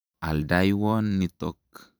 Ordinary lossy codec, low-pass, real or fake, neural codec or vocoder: none; none; fake; vocoder, 44.1 kHz, 128 mel bands every 512 samples, BigVGAN v2